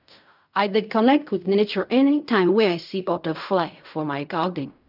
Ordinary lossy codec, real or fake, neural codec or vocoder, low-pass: none; fake; codec, 16 kHz in and 24 kHz out, 0.4 kbps, LongCat-Audio-Codec, fine tuned four codebook decoder; 5.4 kHz